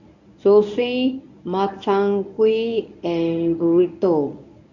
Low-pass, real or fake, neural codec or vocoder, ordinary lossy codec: 7.2 kHz; fake; codec, 24 kHz, 0.9 kbps, WavTokenizer, medium speech release version 1; none